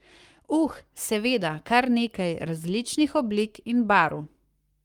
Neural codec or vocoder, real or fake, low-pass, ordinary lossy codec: codec, 44.1 kHz, 7.8 kbps, Pupu-Codec; fake; 19.8 kHz; Opus, 32 kbps